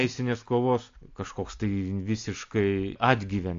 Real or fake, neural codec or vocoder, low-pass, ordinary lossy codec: real; none; 7.2 kHz; AAC, 48 kbps